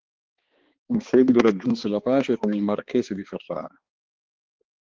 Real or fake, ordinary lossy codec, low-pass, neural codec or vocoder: fake; Opus, 16 kbps; 7.2 kHz; codec, 16 kHz, 2 kbps, X-Codec, HuBERT features, trained on balanced general audio